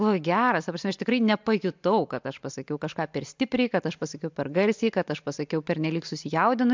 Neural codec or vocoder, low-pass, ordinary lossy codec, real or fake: none; 7.2 kHz; MP3, 64 kbps; real